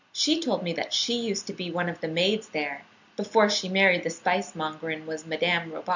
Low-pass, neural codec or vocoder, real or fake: 7.2 kHz; none; real